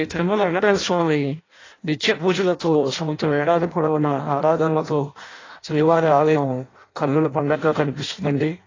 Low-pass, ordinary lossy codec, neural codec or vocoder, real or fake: 7.2 kHz; AAC, 32 kbps; codec, 16 kHz in and 24 kHz out, 0.6 kbps, FireRedTTS-2 codec; fake